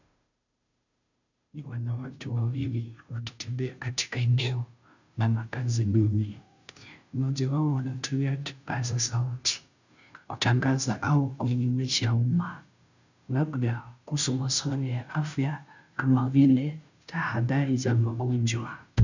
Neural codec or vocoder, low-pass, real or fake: codec, 16 kHz, 0.5 kbps, FunCodec, trained on Chinese and English, 25 frames a second; 7.2 kHz; fake